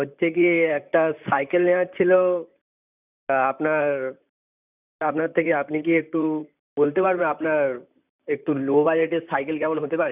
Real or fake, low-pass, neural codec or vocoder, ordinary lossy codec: fake; 3.6 kHz; vocoder, 44.1 kHz, 128 mel bands, Pupu-Vocoder; none